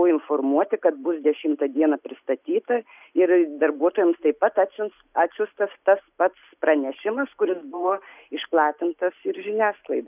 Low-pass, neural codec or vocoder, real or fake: 3.6 kHz; none; real